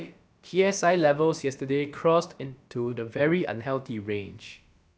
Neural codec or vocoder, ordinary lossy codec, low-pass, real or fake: codec, 16 kHz, about 1 kbps, DyCAST, with the encoder's durations; none; none; fake